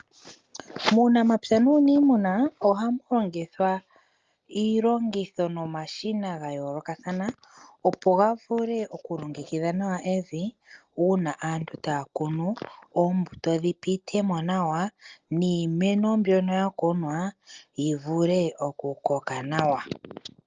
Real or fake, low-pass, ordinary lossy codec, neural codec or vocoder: real; 7.2 kHz; Opus, 24 kbps; none